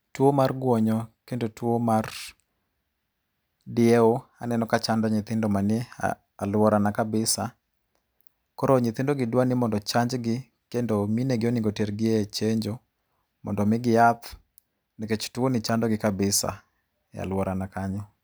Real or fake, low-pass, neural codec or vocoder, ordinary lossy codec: real; none; none; none